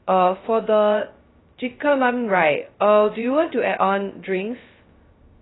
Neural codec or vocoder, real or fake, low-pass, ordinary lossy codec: codec, 16 kHz, 0.2 kbps, FocalCodec; fake; 7.2 kHz; AAC, 16 kbps